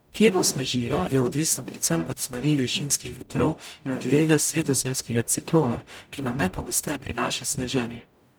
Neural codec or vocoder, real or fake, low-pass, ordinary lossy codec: codec, 44.1 kHz, 0.9 kbps, DAC; fake; none; none